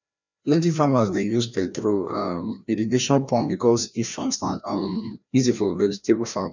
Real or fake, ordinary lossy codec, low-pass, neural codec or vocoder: fake; none; 7.2 kHz; codec, 16 kHz, 1 kbps, FreqCodec, larger model